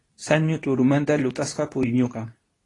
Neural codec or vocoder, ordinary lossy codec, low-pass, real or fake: codec, 24 kHz, 0.9 kbps, WavTokenizer, medium speech release version 2; AAC, 32 kbps; 10.8 kHz; fake